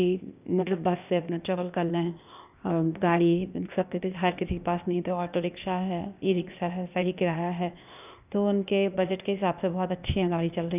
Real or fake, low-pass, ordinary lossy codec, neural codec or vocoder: fake; 3.6 kHz; none; codec, 16 kHz, 0.8 kbps, ZipCodec